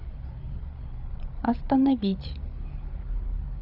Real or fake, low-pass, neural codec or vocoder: fake; 5.4 kHz; codec, 16 kHz, 8 kbps, FreqCodec, larger model